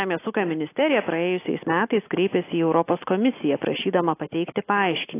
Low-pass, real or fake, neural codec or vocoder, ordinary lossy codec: 3.6 kHz; real; none; AAC, 24 kbps